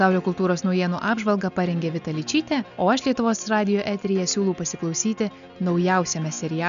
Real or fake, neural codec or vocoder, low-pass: real; none; 7.2 kHz